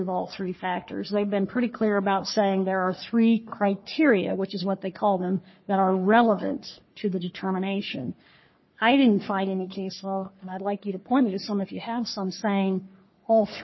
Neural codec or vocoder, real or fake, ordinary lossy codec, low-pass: codec, 44.1 kHz, 3.4 kbps, Pupu-Codec; fake; MP3, 24 kbps; 7.2 kHz